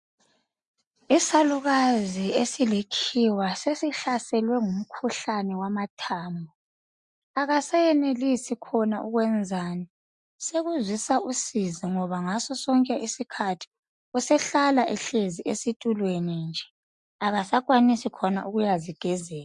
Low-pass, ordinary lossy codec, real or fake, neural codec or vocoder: 10.8 kHz; MP3, 64 kbps; real; none